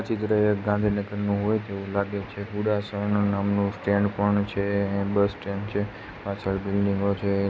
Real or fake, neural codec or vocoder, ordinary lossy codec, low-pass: real; none; none; none